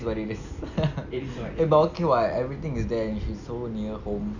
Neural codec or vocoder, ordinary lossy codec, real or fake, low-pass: none; none; real; 7.2 kHz